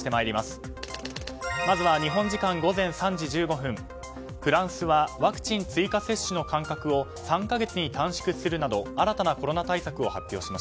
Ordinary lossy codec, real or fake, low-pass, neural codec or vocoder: none; real; none; none